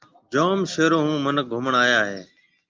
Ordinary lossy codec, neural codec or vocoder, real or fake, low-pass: Opus, 24 kbps; none; real; 7.2 kHz